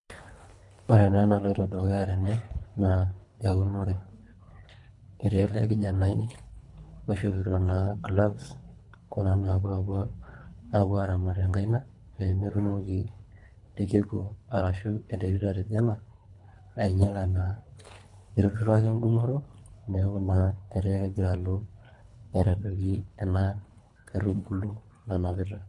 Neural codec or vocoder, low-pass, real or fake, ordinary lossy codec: codec, 24 kHz, 3 kbps, HILCodec; 10.8 kHz; fake; MP3, 64 kbps